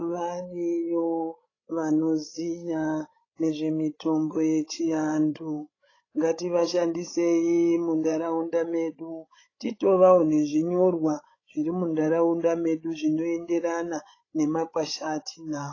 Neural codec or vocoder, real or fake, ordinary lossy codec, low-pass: codec, 16 kHz, 16 kbps, FreqCodec, larger model; fake; AAC, 32 kbps; 7.2 kHz